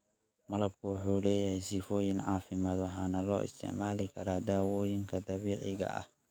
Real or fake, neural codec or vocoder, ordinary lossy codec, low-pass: fake; codec, 44.1 kHz, 7.8 kbps, DAC; none; none